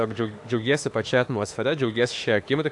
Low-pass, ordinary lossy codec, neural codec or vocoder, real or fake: 10.8 kHz; AAC, 64 kbps; autoencoder, 48 kHz, 32 numbers a frame, DAC-VAE, trained on Japanese speech; fake